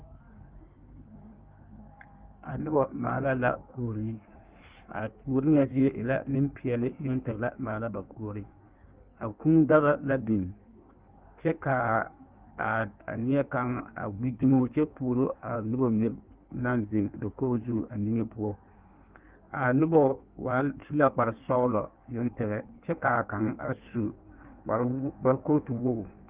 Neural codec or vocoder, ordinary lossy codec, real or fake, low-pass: codec, 16 kHz in and 24 kHz out, 1.1 kbps, FireRedTTS-2 codec; Opus, 32 kbps; fake; 3.6 kHz